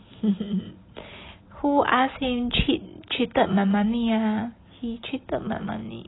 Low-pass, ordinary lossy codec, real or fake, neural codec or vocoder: 7.2 kHz; AAC, 16 kbps; real; none